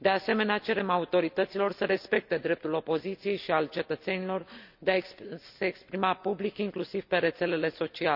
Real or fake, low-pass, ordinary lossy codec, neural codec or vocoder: real; 5.4 kHz; none; none